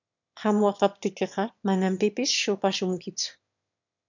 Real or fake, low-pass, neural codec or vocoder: fake; 7.2 kHz; autoencoder, 22.05 kHz, a latent of 192 numbers a frame, VITS, trained on one speaker